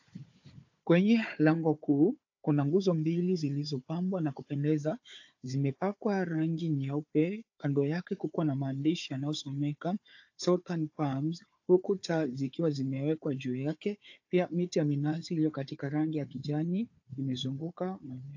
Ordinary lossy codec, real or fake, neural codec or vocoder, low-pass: AAC, 48 kbps; fake; codec, 16 kHz, 4 kbps, FunCodec, trained on Chinese and English, 50 frames a second; 7.2 kHz